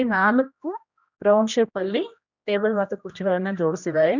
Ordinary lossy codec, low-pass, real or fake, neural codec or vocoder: none; 7.2 kHz; fake; codec, 16 kHz, 1 kbps, X-Codec, HuBERT features, trained on general audio